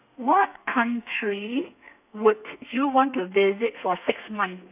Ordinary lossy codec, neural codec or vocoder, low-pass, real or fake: none; codec, 32 kHz, 1.9 kbps, SNAC; 3.6 kHz; fake